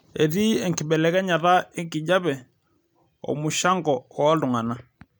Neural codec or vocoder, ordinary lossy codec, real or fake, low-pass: none; none; real; none